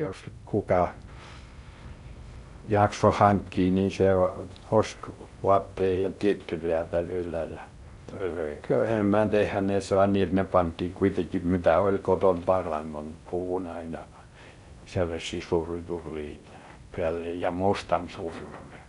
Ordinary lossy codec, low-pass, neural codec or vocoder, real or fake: none; 10.8 kHz; codec, 16 kHz in and 24 kHz out, 0.6 kbps, FocalCodec, streaming, 2048 codes; fake